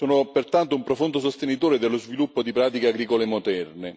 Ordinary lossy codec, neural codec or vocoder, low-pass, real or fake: none; none; none; real